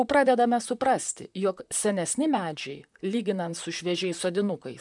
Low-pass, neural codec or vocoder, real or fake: 10.8 kHz; vocoder, 44.1 kHz, 128 mel bands, Pupu-Vocoder; fake